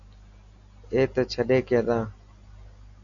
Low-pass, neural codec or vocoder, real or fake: 7.2 kHz; none; real